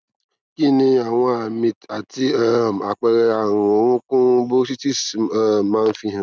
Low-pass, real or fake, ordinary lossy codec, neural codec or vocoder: none; real; none; none